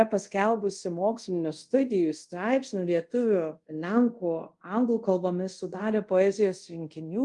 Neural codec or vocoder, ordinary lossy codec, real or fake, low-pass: codec, 24 kHz, 0.5 kbps, DualCodec; Opus, 32 kbps; fake; 10.8 kHz